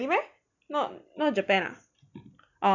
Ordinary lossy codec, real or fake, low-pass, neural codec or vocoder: none; real; 7.2 kHz; none